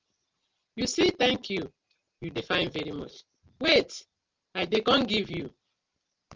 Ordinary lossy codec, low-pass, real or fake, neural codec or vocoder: Opus, 24 kbps; 7.2 kHz; real; none